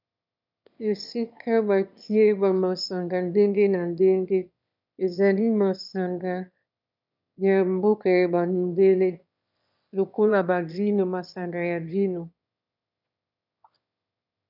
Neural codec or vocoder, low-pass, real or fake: autoencoder, 22.05 kHz, a latent of 192 numbers a frame, VITS, trained on one speaker; 5.4 kHz; fake